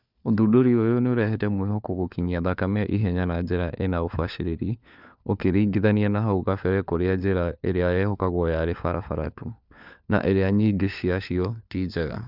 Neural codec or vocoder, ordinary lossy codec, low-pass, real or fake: codec, 16 kHz, 4 kbps, FunCodec, trained on LibriTTS, 50 frames a second; none; 5.4 kHz; fake